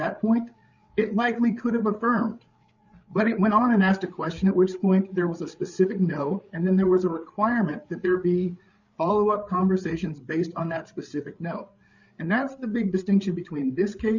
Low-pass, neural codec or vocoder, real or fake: 7.2 kHz; codec, 16 kHz, 8 kbps, FreqCodec, larger model; fake